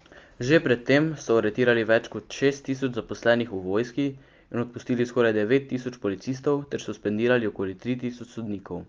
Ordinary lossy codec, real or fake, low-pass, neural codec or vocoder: Opus, 32 kbps; real; 7.2 kHz; none